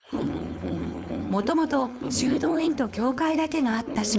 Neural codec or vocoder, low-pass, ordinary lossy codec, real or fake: codec, 16 kHz, 4.8 kbps, FACodec; none; none; fake